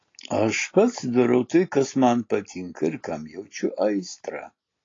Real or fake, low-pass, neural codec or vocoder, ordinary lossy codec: real; 7.2 kHz; none; AAC, 32 kbps